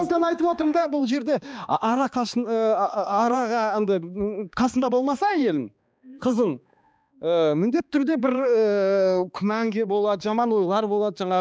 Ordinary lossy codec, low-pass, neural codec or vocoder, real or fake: none; none; codec, 16 kHz, 2 kbps, X-Codec, HuBERT features, trained on balanced general audio; fake